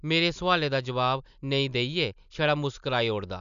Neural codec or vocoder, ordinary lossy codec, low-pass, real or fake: none; none; 7.2 kHz; real